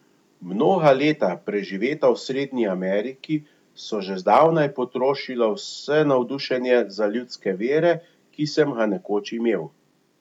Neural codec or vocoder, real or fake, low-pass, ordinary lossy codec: none; real; 19.8 kHz; none